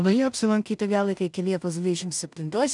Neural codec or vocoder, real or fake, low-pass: codec, 16 kHz in and 24 kHz out, 0.4 kbps, LongCat-Audio-Codec, two codebook decoder; fake; 10.8 kHz